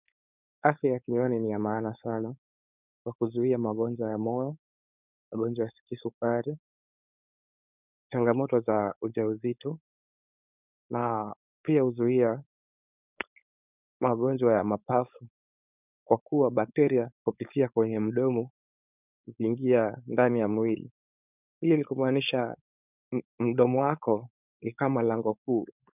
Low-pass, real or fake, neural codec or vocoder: 3.6 kHz; fake; codec, 16 kHz, 4.8 kbps, FACodec